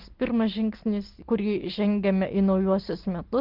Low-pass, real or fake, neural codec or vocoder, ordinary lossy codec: 5.4 kHz; real; none; Opus, 16 kbps